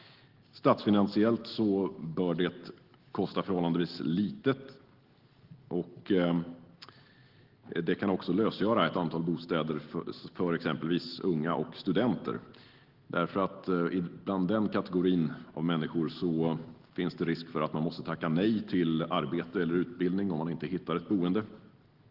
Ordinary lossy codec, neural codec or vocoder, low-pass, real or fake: Opus, 16 kbps; none; 5.4 kHz; real